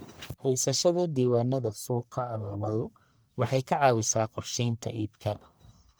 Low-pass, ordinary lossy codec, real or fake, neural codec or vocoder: none; none; fake; codec, 44.1 kHz, 1.7 kbps, Pupu-Codec